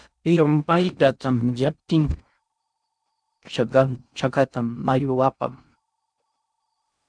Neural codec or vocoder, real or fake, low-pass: codec, 16 kHz in and 24 kHz out, 0.6 kbps, FocalCodec, streaming, 2048 codes; fake; 9.9 kHz